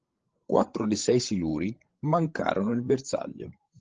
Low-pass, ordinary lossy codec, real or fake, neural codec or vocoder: 7.2 kHz; Opus, 16 kbps; fake; codec, 16 kHz, 16 kbps, FreqCodec, larger model